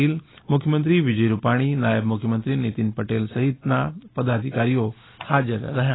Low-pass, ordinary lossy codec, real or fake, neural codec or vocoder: 7.2 kHz; AAC, 16 kbps; real; none